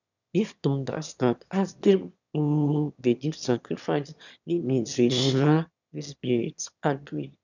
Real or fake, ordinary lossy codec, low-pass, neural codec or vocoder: fake; none; 7.2 kHz; autoencoder, 22.05 kHz, a latent of 192 numbers a frame, VITS, trained on one speaker